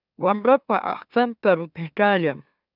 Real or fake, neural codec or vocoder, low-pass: fake; autoencoder, 44.1 kHz, a latent of 192 numbers a frame, MeloTTS; 5.4 kHz